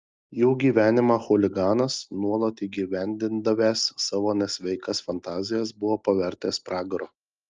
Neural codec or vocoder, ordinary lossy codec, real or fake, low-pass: none; Opus, 24 kbps; real; 7.2 kHz